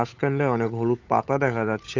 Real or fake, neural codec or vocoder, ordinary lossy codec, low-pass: fake; codec, 16 kHz, 8 kbps, FunCodec, trained on Chinese and English, 25 frames a second; AAC, 48 kbps; 7.2 kHz